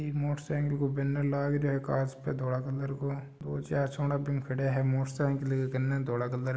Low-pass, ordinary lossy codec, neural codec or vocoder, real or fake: none; none; none; real